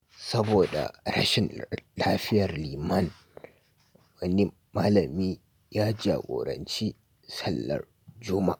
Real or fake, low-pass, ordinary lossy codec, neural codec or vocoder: real; none; none; none